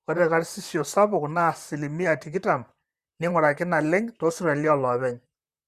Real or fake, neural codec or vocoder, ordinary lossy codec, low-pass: fake; vocoder, 44.1 kHz, 128 mel bands, Pupu-Vocoder; Opus, 64 kbps; 14.4 kHz